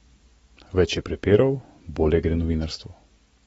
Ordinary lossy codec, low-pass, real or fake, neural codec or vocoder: AAC, 24 kbps; 14.4 kHz; real; none